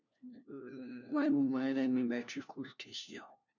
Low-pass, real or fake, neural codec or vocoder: 7.2 kHz; fake; codec, 16 kHz, 1 kbps, FunCodec, trained on LibriTTS, 50 frames a second